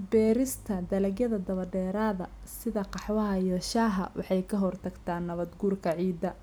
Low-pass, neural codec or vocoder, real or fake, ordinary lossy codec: none; none; real; none